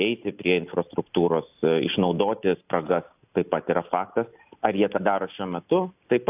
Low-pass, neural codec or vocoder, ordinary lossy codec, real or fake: 3.6 kHz; none; AAC, 32 kbps; real